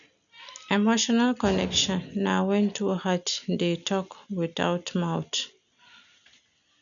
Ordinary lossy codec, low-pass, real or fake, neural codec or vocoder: none; 7.2 kHz; real; none